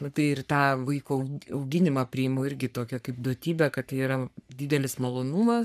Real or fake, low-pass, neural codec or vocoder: fake; 14.4 kHz; codec, 44.1 kHz, 3.4 kbps, Pupu-Codec